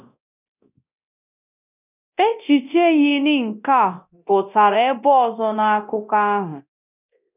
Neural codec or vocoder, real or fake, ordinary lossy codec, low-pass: codec, 24 kHz, 0.9 kbps, DualCodec; fake; none; 3.6 kHz